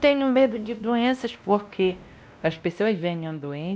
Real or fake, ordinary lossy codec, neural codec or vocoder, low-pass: fake; none; codec, 16 kHz, 1 kbps, X-Codec, WavLM features, trained on Multilingual LibriSpeech; none